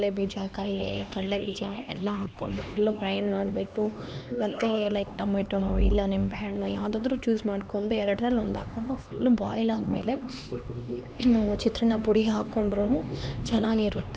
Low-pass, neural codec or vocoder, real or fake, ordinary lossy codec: none; codec, 16 kHz, 2 kbps, X-Codec, HuBERT features, trained on LibriSpeech; fake; none